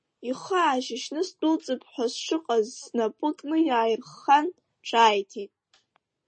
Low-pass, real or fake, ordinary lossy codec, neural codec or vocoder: 9.9 kHz; real; MP3, 32 kbps; none